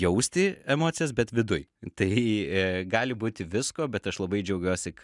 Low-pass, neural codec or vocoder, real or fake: 10.8 kHz; none; real